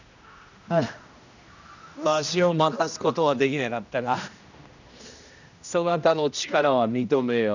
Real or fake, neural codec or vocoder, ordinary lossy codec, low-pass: fake; codec, 16 kHz, 1 kbps, X-Codec, HuBERT features, trained on general audio; none; 7.2 kHz